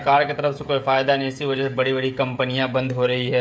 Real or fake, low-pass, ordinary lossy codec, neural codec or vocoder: fake; none; none; codec, 16 kHz, 16 kbps, FreqCodec, smaller model